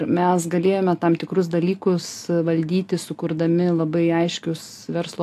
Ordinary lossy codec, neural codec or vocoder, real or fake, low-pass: AAC, 64 kbps; none; real; 14.4 kHz